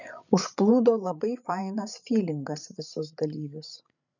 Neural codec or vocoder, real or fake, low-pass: codec, 16 kHz, 8 kbps, FreqCodec, larger model; fake; 7.2 kHz